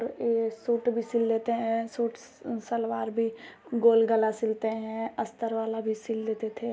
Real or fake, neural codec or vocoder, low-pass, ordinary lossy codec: real; none; none; none